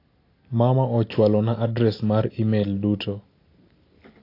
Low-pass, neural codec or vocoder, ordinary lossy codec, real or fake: 5.4 kHz; none; AAC, 32 kbps; real